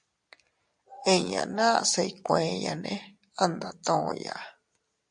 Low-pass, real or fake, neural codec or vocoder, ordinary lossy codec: 9.9 kHz; real; none; MP3, 64 kbps